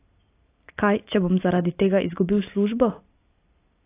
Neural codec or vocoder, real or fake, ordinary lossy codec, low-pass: none; real; AAC, 24 kbps; 3.6 kHz